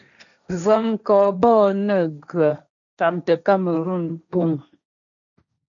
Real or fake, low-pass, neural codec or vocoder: fake; 7.2 kHz; codec, 16 kHz, 1.1 kbps, Voila-Tokenizer